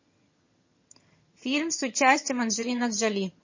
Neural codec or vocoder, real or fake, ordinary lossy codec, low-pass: vocoder, 22.05 kHz, 80 mel bands, HiFi-GAN; fake; MP3, 32 kbps; 7.2 kHz